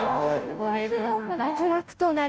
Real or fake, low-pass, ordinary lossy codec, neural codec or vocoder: fake; none; none; codec, 16 kHz, 0.5 kbps, FunCodec, trained on Chinese and English, 25 frames a second